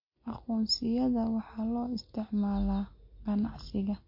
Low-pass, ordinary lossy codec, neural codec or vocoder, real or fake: 5.4 kHz; MP3, 32 kbps; none; real